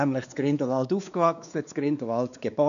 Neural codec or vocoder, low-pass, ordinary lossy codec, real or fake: codec, 16 kHz, 2 kbps, X-Codec, WavLM features, trained on Multilingual LibriSpeech; 7.2 kHz; none; fake